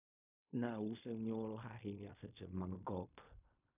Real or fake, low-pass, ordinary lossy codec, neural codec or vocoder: fake; 3.6 kHz; none; codec, 16 kHz in and 24 kHz out, 0.4 kbps, LongCat-Audio-Codec, fine tuned four codebook decoder